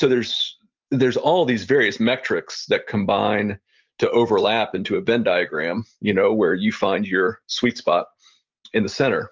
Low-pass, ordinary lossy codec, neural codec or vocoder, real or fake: 7.2 kHz; Opus, 24 kbps; none; real